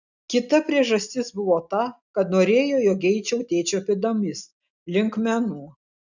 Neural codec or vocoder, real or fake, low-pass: none; real; 7.2 kHz